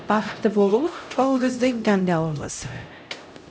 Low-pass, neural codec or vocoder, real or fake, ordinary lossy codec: none; codec, 16 kHz, 0.5 kbps, X-Codec, HuBERT features, trained on LibriSpeech; fake; none